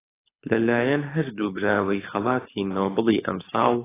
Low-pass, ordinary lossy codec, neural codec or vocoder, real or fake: 3.6 kHz; AAC, 16 kbps; codec, 24 kHz, 6 kbps, HILCodec; fake